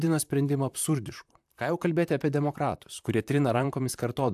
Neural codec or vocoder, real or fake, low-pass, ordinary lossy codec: vocoder, 44.1 kHz, 128 mel bands, Pupu-Vocoder; fake; 14.4 kHz; AAC, 96 kbps